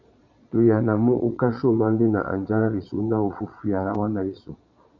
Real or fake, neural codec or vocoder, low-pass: fake; vocoder, 22.05 kHz, 80 mel bands, Vocos; 7.2 kHz